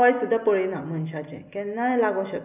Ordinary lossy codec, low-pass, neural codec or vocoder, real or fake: none; 3.6 kHz; none; real